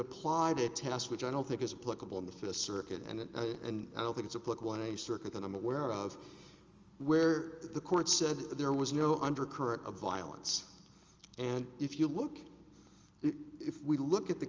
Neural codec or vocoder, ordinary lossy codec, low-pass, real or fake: none; Opus, 32 kbps; 7.2 kHz; real